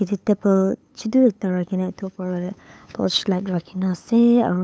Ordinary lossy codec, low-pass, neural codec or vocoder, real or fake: none; none; codec, 16 kHz, 8 kbps, FunCodec, trained on LibriTTS, 25 frames a second; fake